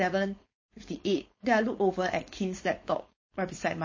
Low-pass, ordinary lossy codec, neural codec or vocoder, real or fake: 7.2 kHz; MP3, 32 kbps; codec, 16 kHz, 4.8 kbps, FACodec; fake